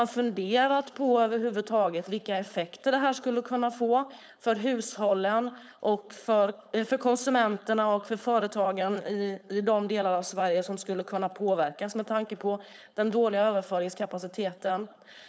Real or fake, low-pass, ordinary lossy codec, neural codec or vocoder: fake; none; none; codec, 16 kHz, 4.8 kbps, FACodec